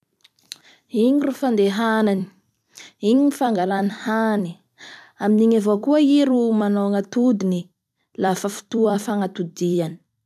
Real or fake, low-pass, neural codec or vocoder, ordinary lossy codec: fake; 14.4 kHz; vocoder, 44.1 kHz, 128 mel bands every 256 samples, BigVGAN v2; none